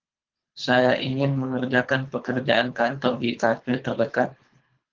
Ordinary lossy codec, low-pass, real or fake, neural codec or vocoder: Opus, 32 kbps; 7.2 kHz; fake; codec, 24 kHz, 3 kbps, HILCodec